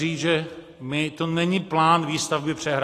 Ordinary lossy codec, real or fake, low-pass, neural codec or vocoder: AAC, 48 kbps; real; 14.4 kHz; none